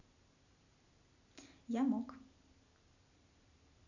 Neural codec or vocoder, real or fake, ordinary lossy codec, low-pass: none; real; none; 7.2 kHz